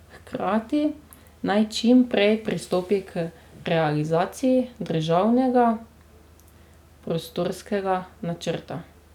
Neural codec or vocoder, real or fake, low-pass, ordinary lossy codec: none; real; 19.8 kHz; none